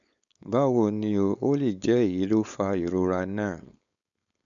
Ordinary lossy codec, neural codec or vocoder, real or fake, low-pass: none; codec, 16 kHz, 4.8 kbps, FACodec; fake; 7.2 kHz